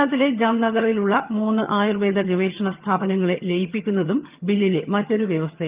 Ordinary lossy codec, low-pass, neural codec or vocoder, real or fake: Opus, 24 kbps; 3.6 kHz; vocoder, 22.05 kHz, 80 mel bands, HiFi-GAN; fake